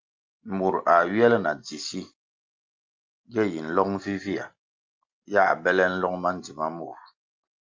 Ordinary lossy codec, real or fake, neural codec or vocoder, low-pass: Opus, 24 kbps; real; none; 7.2 kHz